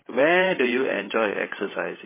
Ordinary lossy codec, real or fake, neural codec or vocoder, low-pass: MP3, 16 kbps; fake; codec, 16 kHz, 16 kbps, FreqCodec, larger model; 3.6 kHz